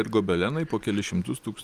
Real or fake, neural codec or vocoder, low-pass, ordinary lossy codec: real; none; 14.4 kHz; Opus, 32 kbps